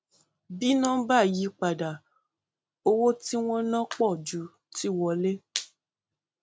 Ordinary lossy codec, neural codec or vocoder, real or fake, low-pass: none; none; real; none